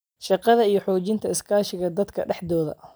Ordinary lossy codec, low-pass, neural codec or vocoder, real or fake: none; none; none; real